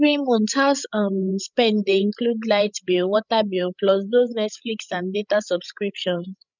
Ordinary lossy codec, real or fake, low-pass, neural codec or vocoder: none; fake; 7.2 kHz; codec, 16 kHz, 16 kbps, FreqCodec, larger model